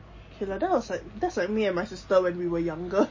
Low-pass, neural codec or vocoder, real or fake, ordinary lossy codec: 7.2 kHz; none; real; MP3, 32 kbps